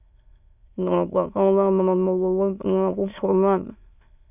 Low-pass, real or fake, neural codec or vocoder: 3.6 kHz; fake; autoencoder, 22.05 kHz, a latent of 192 numbers a frame, VITS, trained on many speakers